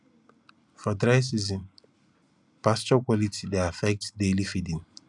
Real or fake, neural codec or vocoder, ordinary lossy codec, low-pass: real; none; none; 10.8 kHz